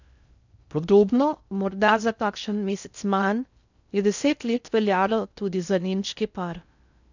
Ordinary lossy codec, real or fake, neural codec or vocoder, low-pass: none; fake; codec, 16 kHz in and 24 kHz out, 0.6 kbps, FocalCodec, streaming, 2048 codes; 7.2 kHz